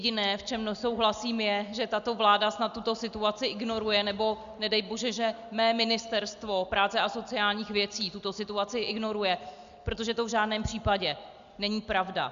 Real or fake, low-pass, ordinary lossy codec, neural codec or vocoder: real; 7.2 kHz; Opus, 64 kbps; none